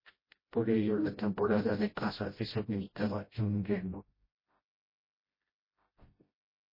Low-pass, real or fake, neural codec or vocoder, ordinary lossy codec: 5.4 kHz; fake; codec, 16 kHz, 0.5 kbps, FreqCodec, smaller model; MP3, 24 kbps